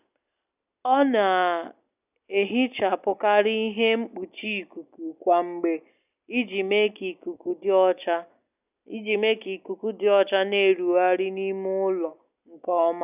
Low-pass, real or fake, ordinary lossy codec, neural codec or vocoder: 3.6 kHz; real; none; none